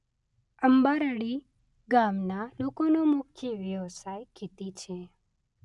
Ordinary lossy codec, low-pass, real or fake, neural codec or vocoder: none; 10.8 kHz; real; none